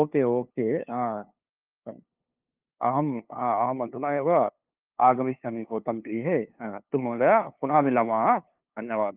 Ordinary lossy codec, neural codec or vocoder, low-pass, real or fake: Opus, 32 kbps; codec, 16 kHz, 2 kbps, FunCodec, trained on LibriTTS, 25 frames a second; 3.6 kHz; fake